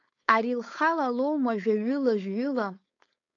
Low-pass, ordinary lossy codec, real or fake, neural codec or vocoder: 7.2 kHz; AAC, 48 kbps; fake; codec, 16 kHz, 4.8 kbps, FACodec